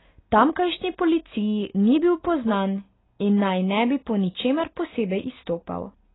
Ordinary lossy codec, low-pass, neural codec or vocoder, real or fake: AAC, 16 kbps; 7.2 kHz; none; real